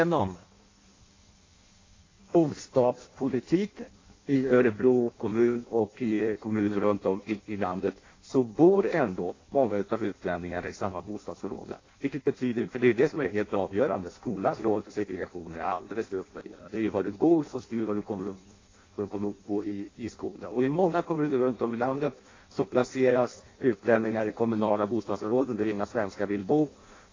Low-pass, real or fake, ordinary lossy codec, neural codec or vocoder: 7.2 kHz; fake; AAC, 32 kbps; codec, 16 kHz in and 24 kHz out, 0.6 kbps, FireRedTTS-2 codec